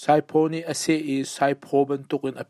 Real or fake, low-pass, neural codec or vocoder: real; 14.4 kHz; none